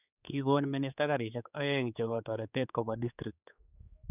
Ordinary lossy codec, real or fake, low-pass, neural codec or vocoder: none; fake; 3.6 kHz; codec, 16 kHz, 4 kbps, X-Codec, HuBERT features, trained on general audio